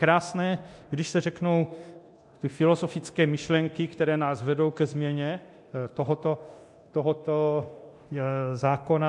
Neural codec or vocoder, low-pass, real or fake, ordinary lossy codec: codec, 24 kHz, 0.9 kbps, DualCodec; 10.8 kHz; fake; MP3, 64 kbps